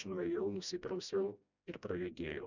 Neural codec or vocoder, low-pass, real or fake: codec, 16 kHz, 1 kbps, FreqCodec, smaller model; 7.2 kHz; fake